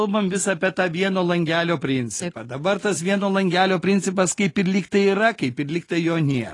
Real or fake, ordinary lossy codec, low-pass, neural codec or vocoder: real; AAC, 32 kbps; 10.8 kHz; none